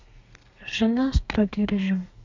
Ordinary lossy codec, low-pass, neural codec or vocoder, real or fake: MP3, 64 kbps; 7.2 kHz; codec, 32 kHz, 1.9 kbps, SNAC; fake